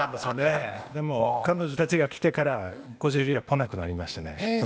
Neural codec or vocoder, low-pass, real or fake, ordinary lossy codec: codec, 16 kHz, 0.8 kbps, ZipCodec; none; fake; none